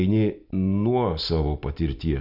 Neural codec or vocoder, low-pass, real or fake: none; 5.4 kHz; real